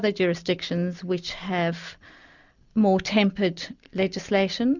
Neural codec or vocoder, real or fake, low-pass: none; real; 7.2 kHz